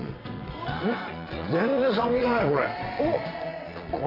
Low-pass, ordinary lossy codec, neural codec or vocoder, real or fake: 5.4 kHz; AAC, 24 kbps; vocoder, 22.05 kHz, 80 mel bands, WaveNeXt; fake